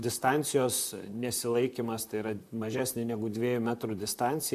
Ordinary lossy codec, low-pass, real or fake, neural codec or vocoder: MP3, 96 kbps; 14.4 kHz; fake; vocoder, 44.1 kHz, 128 mel bands, Pupu-Vocoder